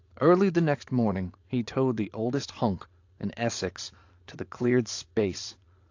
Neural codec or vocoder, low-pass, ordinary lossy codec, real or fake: vocoder, 22.05 kHz, 80 mel bands, Vocos; 7.2 kHz; AAC, 48 kbps; fake